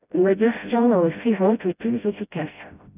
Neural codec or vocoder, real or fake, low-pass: codec, 16 kHz, 0.5 kbps, FreqCodec, smaller model; fake; 3.6 kHz